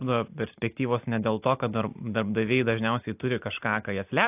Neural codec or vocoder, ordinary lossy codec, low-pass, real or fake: none; AAC, 32 kbps; 3.6 kHz; real